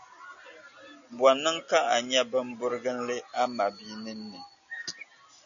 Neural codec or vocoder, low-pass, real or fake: none; 7.2 kHz; real